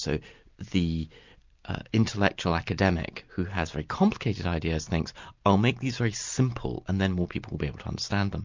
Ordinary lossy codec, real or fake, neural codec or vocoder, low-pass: MP3, 64 kbps; real; none; 7.2 kHz